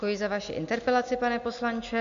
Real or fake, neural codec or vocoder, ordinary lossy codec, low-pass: real; none; AAC, 96 kbps; 7.2 kHz